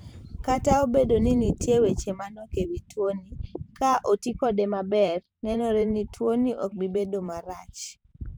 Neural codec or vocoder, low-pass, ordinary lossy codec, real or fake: vocoder, 44.1 kHz, 128 mel bands every 512 samples, BigVGAN v2; none; none; fake